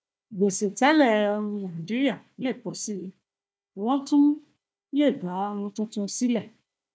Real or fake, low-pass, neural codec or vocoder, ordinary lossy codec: fake; none; codec, 16 kHz, 1 kbps, FunCodec, trained on Chinese and English, 50 frames a second; none